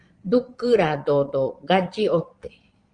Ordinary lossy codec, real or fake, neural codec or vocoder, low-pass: Opus, 24 kbps; fake; vocoder, 22.05 kHz, 80 mel bands, Vocos; 9.9 kHz